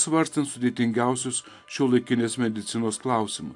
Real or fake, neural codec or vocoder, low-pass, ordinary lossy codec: real; none; 10.8 kHz; AAC, 64 kbps